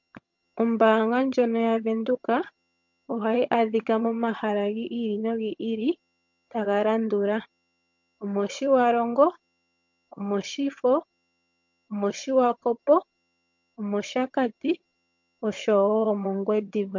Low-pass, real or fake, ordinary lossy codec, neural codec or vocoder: 7.2 kHz; fake; MP3, 48 kbps; vocoder, 22.05 kHz, 80 mel bands, HiFi-GAN